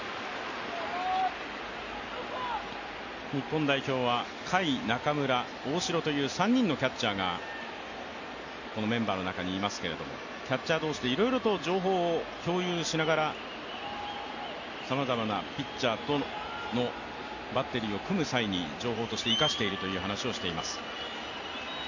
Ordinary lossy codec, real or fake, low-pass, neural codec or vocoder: none; real; 7.2 kHz; none